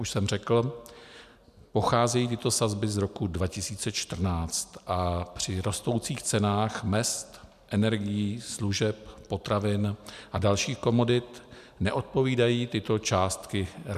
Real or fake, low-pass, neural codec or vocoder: real; 14.4 kHz; none